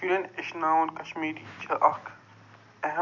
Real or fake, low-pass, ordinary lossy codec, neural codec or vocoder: real; 7.2 kHz; AAC, 48 kbps; none